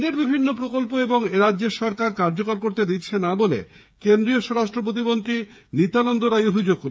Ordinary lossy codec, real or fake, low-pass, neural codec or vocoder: none; fake; none; codec, 16 kHz, 8 kbps, FreqCodec, smaller model